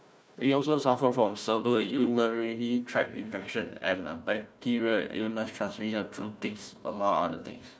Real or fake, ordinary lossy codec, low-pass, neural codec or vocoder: fake; none; none; codec, 16 kHz, 1 kbps, FunCodec, trained on Chinese and English, 50 frames a second